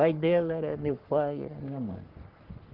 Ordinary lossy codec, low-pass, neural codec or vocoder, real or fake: Opus, 16 kbps; 5.4 kHz; codec, 44.1 kHz, 3.4 kbps, Pupu-Codec; fake